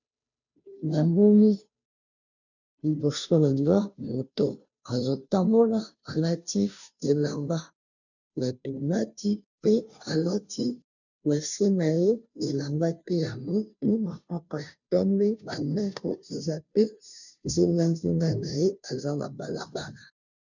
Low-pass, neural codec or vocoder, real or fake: 7.2 kHz; codec, 16 kHz, 0.5 kbps, FunCodec, trained on Chinese and English, 25 frames a second; fake